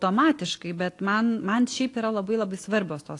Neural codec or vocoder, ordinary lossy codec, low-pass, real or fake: none; AAC, 48 kbps; 10.8 kHz; real